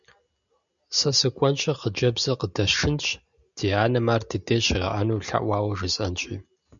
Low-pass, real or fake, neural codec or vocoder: 7.2 kHz; real; none